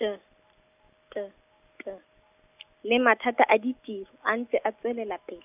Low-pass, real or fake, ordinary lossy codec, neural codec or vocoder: 3.6 kHz; real; none; none